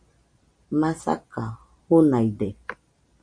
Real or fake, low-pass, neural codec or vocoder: fake; 9.9 kHz; vocoder, 44.1 kHz, 128 mel bands every 256 samples, BigVGAN v2